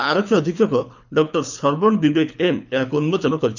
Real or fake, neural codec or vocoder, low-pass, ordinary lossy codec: fake; codec, 24 kHz, 6 kbps, HILCodec; 7.2 kHz; none